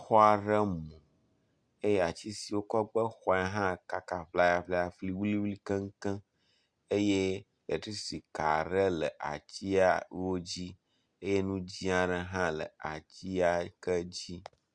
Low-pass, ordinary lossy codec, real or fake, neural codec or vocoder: 9.9 kHz; Opus, 64 kbps; real; none